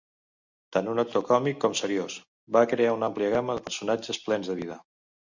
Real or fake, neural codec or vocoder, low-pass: real; none; 7.2 kHz